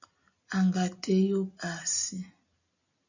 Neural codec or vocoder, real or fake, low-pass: none; real; 7.2 kHz